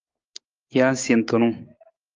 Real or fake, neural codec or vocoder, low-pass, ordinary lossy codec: fake; codec, 16 kHz, 6 kbps, DAC; 7.2 kHz; Opus, 32 kbps